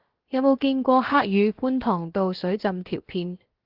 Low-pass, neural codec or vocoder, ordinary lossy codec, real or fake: 5.4 kHz; codec, 16 kHz, 0.7 kbps, FocalCodec; Opus, 16 kbps; fake